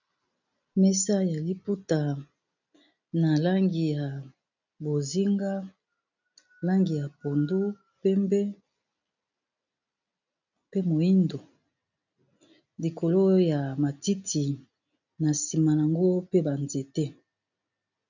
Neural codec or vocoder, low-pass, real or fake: none; 7.2 kHz; real